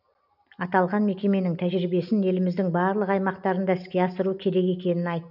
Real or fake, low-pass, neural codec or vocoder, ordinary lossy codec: real; 5.4 kHz; none; none